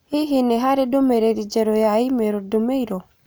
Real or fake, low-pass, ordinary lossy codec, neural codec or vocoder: real; none; none; none